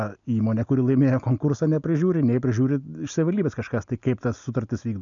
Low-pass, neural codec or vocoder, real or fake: 7.2 kHz; none; real